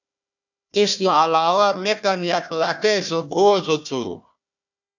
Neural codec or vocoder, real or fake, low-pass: codec, 16 kHz, 1 kbps, FunCodec, trained on Chinese and English, 50 frames a second; fake; 7.2 kHz